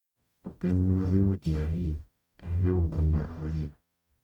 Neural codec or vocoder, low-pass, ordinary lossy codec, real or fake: codec, 44.1 kHz, 0.9 kbps, DAC; 19.8 kHz; MP3, 96 kbps; fake